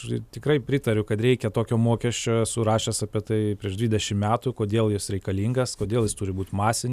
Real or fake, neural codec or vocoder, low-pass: real; none; 14.4 kHz